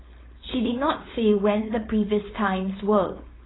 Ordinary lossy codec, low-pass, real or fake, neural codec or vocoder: AAC, 16 kbps; 7.2 kHz; fake; codec, 16 kHz, 4.8 kbps, FACodec